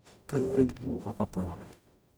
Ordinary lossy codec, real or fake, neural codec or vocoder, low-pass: none; fake; codec, 44.1 kHz, 0.9 kbps, DAC; none